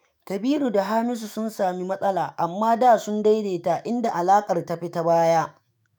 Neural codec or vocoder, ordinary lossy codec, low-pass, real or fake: autoencoder, 48 kHz, 128 numbers a frame, DAC-VAE, trained on Japanese speech; none; none; fake